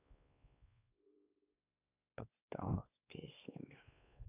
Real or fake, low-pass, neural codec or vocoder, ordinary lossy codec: fake; 3.6 kHz; codec, 16 kHz, 2 kbps, X-Codec, WavLM features, trained on Multilingual LibriSpeech; none